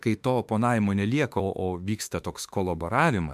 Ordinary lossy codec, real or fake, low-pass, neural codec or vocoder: MP3, 96 kbps; fake; 14.4 kHz; autoencoder, 48 kHz, 32 numbers a frame, DAC-VAE, trained on Japanese speech